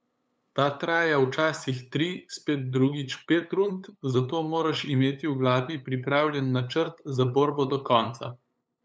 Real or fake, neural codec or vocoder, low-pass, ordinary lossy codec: fake; codec, 16 kHz, 8 kbps, FunCodec, trained on LibriTTS, 25 frames a second; none; none